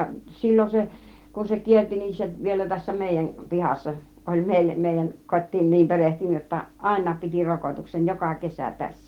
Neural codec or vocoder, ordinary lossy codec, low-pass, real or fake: none; Opus, 16 kbps; 19.8 kHz; real